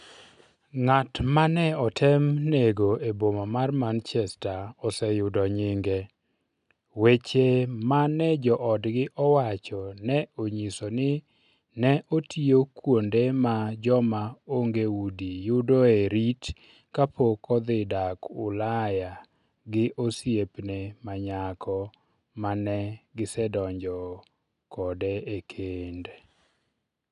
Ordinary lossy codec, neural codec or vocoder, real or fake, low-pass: none; none; real; 10.8 kHz